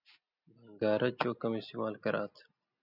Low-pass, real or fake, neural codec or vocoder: 5.4 kHz; real; none